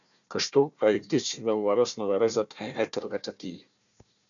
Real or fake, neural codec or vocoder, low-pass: fake; codec, 16 kHz, 1 kbps, FunCodec, trained on Chinese and English, 50 frames a second; 7.2 kHz